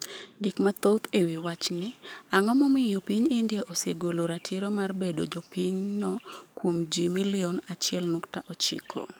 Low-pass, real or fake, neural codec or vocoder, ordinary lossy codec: none; fake; codec, 44.1 kHz, 7.8 kbps, DAC; none